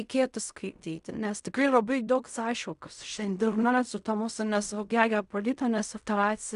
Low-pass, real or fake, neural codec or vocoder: 10.8 kHz; fake; codec, 16 kHz in and 24 kHz out, 0.4 kbps, LongCat-Audio-Codec, fine tuned four codebook decoder